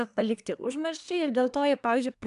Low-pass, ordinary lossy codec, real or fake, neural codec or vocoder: 10.8 kHz; MP3, 96 kbps; fake; codec, 24 kHz, 1 kbps, SNAC